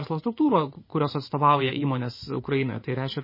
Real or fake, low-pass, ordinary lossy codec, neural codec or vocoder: fake; 5.4 kHz; MP3, 24 kbps; vocoder, 24 kHz, 100 mel bands, Vocos